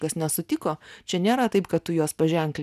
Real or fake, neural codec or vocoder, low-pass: real; none; 14.4 kHz